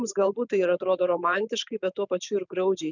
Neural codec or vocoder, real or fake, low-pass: vocoder, 44.1 kHz, 128 mel bands every 512 samples, BigVGAN v2; fake; 7.2 kHz